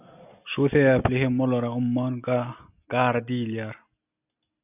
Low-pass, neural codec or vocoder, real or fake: 3.6 kHz; none; real